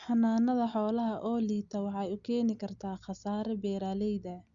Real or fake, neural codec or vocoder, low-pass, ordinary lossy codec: real; none; 7.2 kHz; Opus, 64 kbps